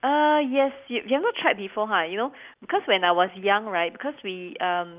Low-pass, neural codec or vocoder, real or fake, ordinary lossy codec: 3.6 kHz; none; real; Opus, 24 kbps